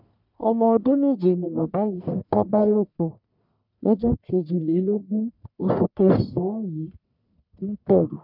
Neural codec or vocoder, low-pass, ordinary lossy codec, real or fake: codec, 44.1 kHz, 1.7 kbps, Pupu-Codec; 5.4 kHz; none; fake